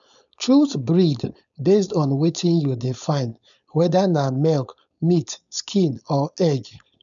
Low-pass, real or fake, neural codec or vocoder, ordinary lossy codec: 7.2 kHz; fake; codec, 16 kHz, 4.8 kbps, FACodec; none